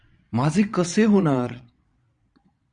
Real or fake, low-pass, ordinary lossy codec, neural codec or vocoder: fake; 9.9 kHz; Opus, 64 kbps; vocoder, 22.05 kHz, 80 mel bands, Vocos